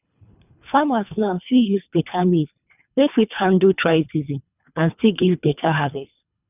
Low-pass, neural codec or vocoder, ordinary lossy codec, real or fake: 3.6 kHz; codec, 24 kHz, 3 kbps, HILCodec; none; fake